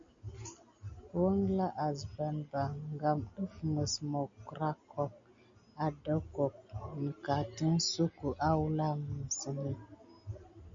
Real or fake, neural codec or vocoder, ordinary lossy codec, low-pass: real; none; MP3, 48 kbps; 7.2 kHz